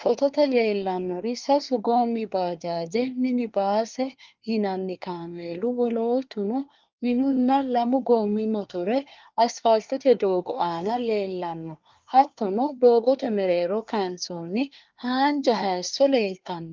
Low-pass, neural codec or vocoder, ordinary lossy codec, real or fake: 7.2 kHz; codec, 24 kHz, 1 kbps, SNAC; Opus, 24 kbps; fake